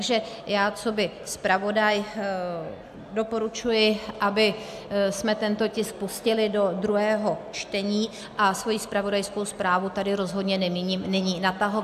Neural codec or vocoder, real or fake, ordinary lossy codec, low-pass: none; real; AAC, 96 kbps; 14.4 kHz